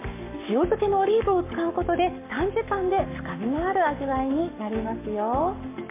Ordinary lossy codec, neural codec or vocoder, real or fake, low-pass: MP3, 32 kbps; codec, 44.1 kHz, 7.8 kbps, Pupu-Codec; fake; 3.6 kHz